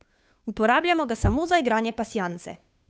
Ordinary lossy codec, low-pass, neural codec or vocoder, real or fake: none; none; codec, 16 kHz, 2 kbps, FunCodec, trained on Chinese and English, 25 frames a second; fake